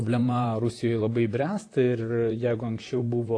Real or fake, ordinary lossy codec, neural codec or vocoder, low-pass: fake; AAC, 48 kbps; vocoder, 44.1 kHz, 128 mel bands, Pupu-Vocoder; 9.9 kHz